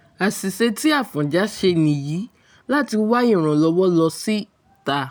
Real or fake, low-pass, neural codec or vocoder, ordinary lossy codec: real; none; none; none